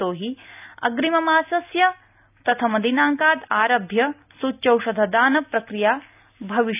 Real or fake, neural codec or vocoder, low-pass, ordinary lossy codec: real; none; 3.6 kHz; AAC, 32 kbps